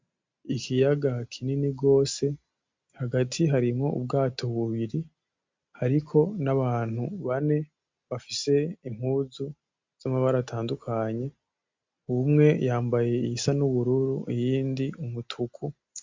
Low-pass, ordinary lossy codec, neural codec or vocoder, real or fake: 7.2 kHz; MP3, 64 kbps; none; real